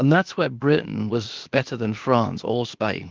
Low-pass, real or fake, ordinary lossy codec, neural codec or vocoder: 7.2 kHz; fake; Opus, 32 kbps; codec, 16 kHz, 0.8 kbps, ZipCodec